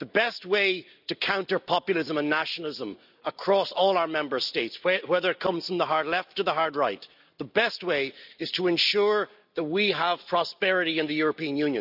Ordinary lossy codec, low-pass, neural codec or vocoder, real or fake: none; 5.4 kHz; none; real